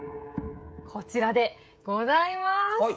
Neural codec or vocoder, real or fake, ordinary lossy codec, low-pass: codec, 16 kHz, 16 kbps, FreqCodec, smaller model; fake; none; none